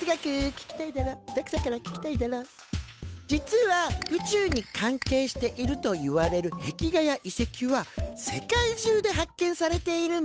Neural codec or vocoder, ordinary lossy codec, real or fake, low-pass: codec, 16 kHz, 8 kbps, FunCodec, trained on Chinese and English, 25 frames a second; none; fake; none